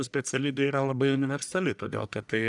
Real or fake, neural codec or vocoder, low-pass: fake; codec, 44.1 kHz, 1.7 kbps, Pupu-Codec; 10.8 kHz